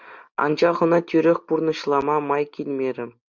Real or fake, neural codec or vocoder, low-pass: real; none; 7.2 kHz